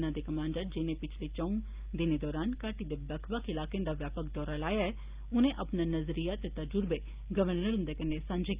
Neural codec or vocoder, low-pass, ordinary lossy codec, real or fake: none; 3.6 kHz; Opus, 32 kbps; real